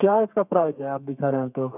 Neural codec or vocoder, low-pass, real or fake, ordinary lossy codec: codec, 32 kHz, 1.9 kbps, SNAC; 3.6 kHz; fake; none